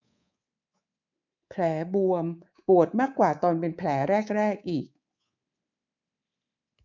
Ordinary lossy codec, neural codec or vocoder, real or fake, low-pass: none; codec, 24 kHz, 3.1 kbps, DualCodec; fake; 7.2 kHz